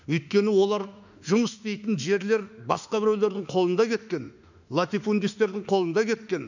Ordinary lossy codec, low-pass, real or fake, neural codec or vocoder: none; 7.2 kHz; fake; autoencoder, 48 kHz, 32 numbers a frame, DAC-VAE, trained on Japanese speech